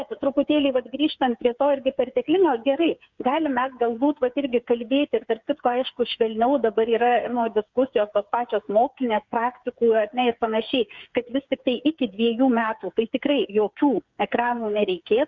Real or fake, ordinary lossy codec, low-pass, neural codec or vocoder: fake; Opus, 64 kbps; 7.2 kHz; codec, 16 kHz, 8 kbps, FreqCodec, smaller model